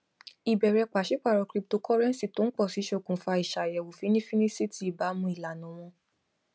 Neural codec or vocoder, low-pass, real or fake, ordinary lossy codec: none; none; real; none